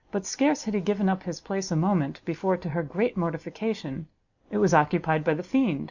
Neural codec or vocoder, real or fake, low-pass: none; real; 7.2 kHz